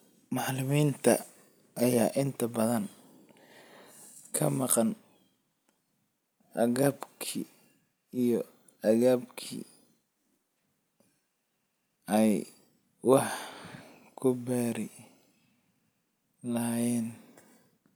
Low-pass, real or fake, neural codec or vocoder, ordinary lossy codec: none; real; none; none